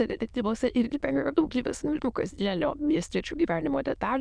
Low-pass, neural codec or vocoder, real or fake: 9.9 kHz; autoencoder, 22.05 kHz, a latent of 192 numbers a frame, VITS, trained on many speakers; fake